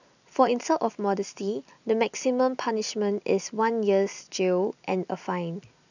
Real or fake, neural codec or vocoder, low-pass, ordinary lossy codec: real; none; 7.2 kHz; none